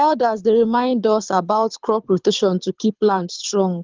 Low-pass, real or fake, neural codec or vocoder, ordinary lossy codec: 7.2 kHz; fake; codec, 24 kHz, 6 kbps, HILCodec; Opus, 32 kbps